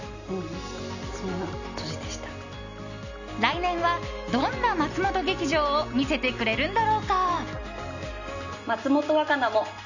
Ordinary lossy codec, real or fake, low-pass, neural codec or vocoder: none; real; 7.2 kHz; none